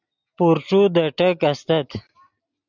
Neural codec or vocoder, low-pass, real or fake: none; 7.2 kHz; real